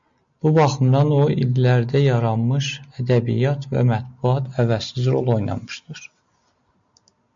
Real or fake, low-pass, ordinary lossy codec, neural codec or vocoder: real; 7.2 kHz; MP3, 64 kbps; none